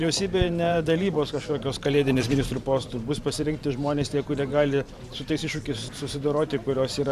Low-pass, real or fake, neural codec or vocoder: 14.4 kHz; fake; vocoder, 44.1 kHz, 128 mel bands every 512 samples, BigVGAN v2